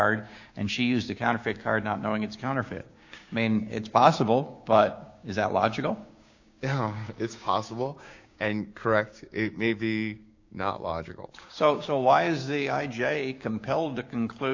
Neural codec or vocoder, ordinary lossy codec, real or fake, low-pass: codec, 16 kHz, 6 kbps, DAC; AAC, 48 kbps; fake; 7.2 kHz